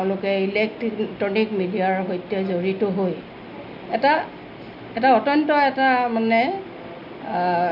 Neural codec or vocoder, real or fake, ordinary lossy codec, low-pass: none; real; none; 5.4 kHz